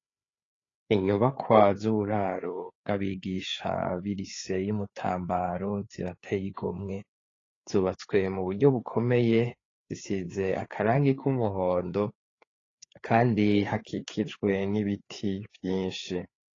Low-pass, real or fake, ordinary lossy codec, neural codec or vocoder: 7.2 kHz; fake; AAC, 32 kbps; codec, 16 kHz, 4 kbps, FreqCodec, larger model